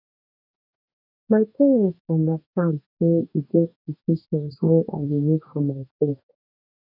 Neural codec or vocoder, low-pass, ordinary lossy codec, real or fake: codec, 44.1 kHz, 2.6 kbps, DAC; 5.4 kHz; none; fake